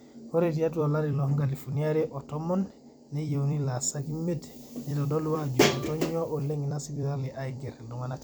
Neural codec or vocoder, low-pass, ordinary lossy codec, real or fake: vocoder, 44.1 kHz, 128 mel bands every 256 samples, BigVGAN v2; none; none; fake